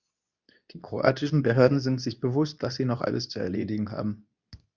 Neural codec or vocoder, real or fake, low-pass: codec, 24 kHz, 0.9 kbps, WavTokenizer, medium speech release version 2; fake; 7.2 kHz